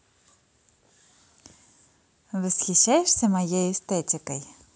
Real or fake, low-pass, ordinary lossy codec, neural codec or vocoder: real; none; none; none